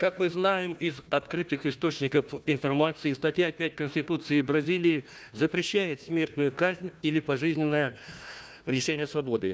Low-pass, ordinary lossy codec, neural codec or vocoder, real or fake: none; none; codec, 16 kHz, 1 kbps, FunCodec, trained on Chinese and English, 50 frames a second; fake